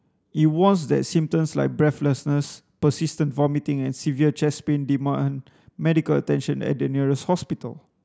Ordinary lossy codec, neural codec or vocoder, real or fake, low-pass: none; none; real; none